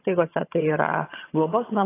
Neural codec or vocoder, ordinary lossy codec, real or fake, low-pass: vocoder, 22.05 kHz, 80 mel bands, HiFi-GAN; AAC, 24 kbps; fake; 3.6 kHz